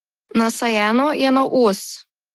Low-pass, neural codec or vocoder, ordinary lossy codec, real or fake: 19.8 kHz; autoencoder, 48 kHz, 128 numbers a frame, DAC-VAE, trained on Japanese speech; Opus, 16 kbps; fake